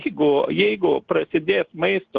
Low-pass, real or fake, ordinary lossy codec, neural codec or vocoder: 7.2 kHz; real; Opus, 16 kbps; none